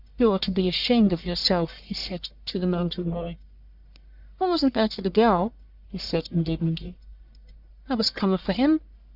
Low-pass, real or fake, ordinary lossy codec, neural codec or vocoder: 5.4 kHz; fake; Opus, 64 kbps; codec, 44.1 kHz, 1.7 kbps, Pupu-Codec